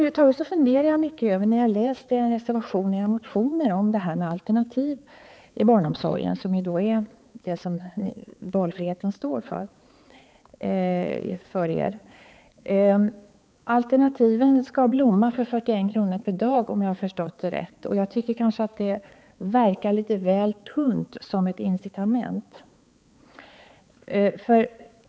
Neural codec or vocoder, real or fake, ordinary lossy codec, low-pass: codec, 16 kHz, 4 kbps, X-Codec, HuBERT features, trained on balanced general audio; fake; none; none